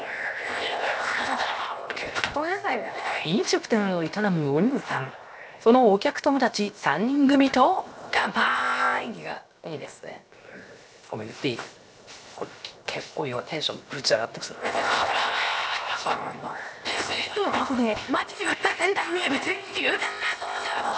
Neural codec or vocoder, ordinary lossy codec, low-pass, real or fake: codec, 16 kHz, 0.7 kbps, FocalCodec; none; none; fake